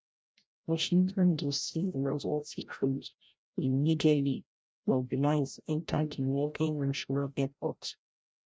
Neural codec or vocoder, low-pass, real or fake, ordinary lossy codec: codec, 16 kHz, 0.5 kbps, FreqCodec, larger model; none; fake; none